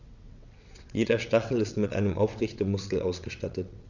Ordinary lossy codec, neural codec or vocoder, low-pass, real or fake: none; vocoder, 22.05 kHz, 80 mel bands, WaveNeXt; 7.2 kHz; fake